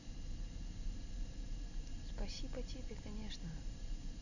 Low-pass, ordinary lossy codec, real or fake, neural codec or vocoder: 7.2 kHz; none; real; none